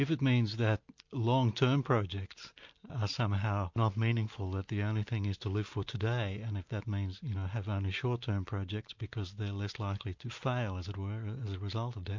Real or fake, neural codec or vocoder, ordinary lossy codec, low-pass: real; none; MP3, 48 kbps; 7.2 kHz